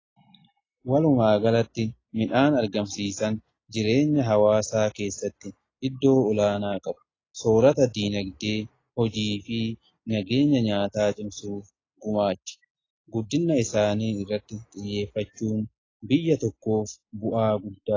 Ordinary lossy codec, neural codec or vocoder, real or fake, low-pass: AAC, 32 kbps; none; real; 7.2 kHz